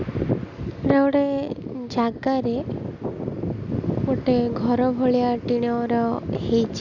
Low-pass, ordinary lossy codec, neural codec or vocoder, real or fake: 7.2 kHz; none; none; real